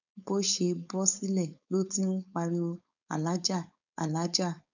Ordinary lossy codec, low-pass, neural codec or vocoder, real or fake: none; 7.2 kHz; codec, 16 kHz, 4.8 kbps, FACodec; fake